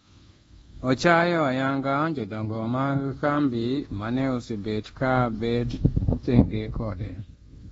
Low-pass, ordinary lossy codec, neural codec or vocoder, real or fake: 10.8 kHz; AAC, 24 kbps; codec, 24 kHz, 1.2 kbps, DualCodec; fake